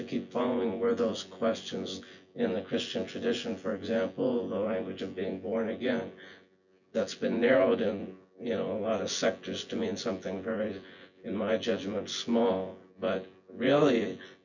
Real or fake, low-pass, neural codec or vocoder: fake; 7.2 kHz; vocoder, 24 kHz, 100 mel bands, Vocos